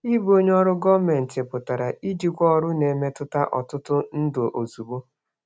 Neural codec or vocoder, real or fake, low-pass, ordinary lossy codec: none; real; none; none